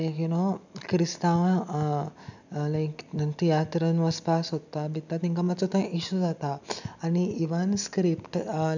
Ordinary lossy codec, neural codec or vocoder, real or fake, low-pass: none; none; real; 7.2 kHz